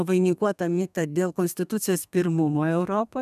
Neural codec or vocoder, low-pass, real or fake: codec, 32 kHz, 1.9 kbps, SNAC; 14.4 kHz; fake